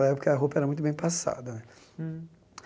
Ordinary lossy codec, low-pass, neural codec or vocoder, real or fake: none; none; none; real